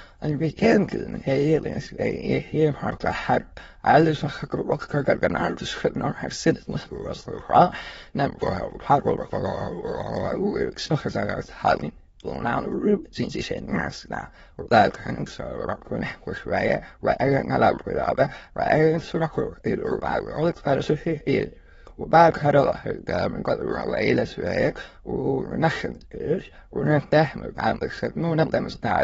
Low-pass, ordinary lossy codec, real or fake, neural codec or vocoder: 9.9 kHz; AAC, 24 kbps; fake; autoencoder, 22.05 kHz, a latent of 192 numbers a frame, VITS, trained on many speakers